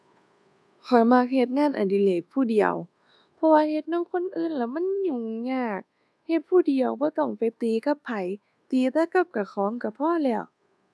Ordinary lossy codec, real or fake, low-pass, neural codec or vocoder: none; fake; none; codec, 24 kHz, 1.2 kbps, DualCodec